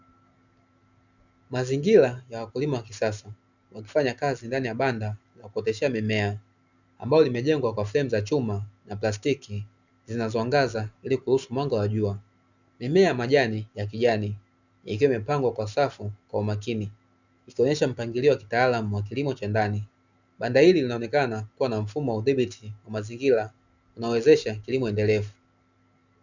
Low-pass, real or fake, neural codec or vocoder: 7.2 kHz; real; none